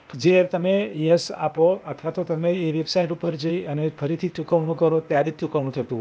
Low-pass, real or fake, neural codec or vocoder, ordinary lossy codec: none; fake; codec, 16 kHz, 0.8 kbps, ZipCodec; none